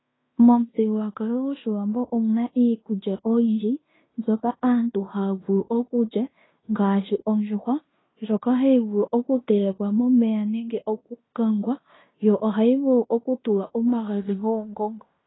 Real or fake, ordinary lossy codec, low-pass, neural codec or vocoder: fake; AAC, 16 kbps; 7.2 kHz; codec, 16 kHz in and 24 kHz out, 0.9 kbps, LongCat-Audio-Codec, fine tuned four codebook decoder